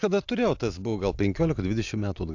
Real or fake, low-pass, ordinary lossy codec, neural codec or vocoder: real; 7.2 kHz; AAC, 48 kbps; none